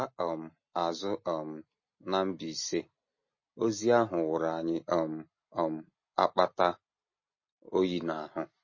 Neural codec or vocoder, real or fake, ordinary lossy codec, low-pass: none; real; MP3, 32 kbps; 7.2 kHz